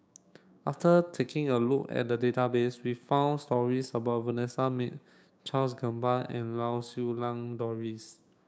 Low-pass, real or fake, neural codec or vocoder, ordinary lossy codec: none; fake; codec, 16 kHz, 6 kbps, DAC; none